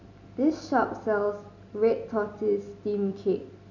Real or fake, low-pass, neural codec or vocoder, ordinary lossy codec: real; 7.2 kHz; none; none